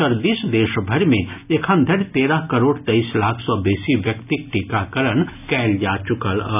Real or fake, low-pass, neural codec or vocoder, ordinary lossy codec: real; 3.6 kHz; none; none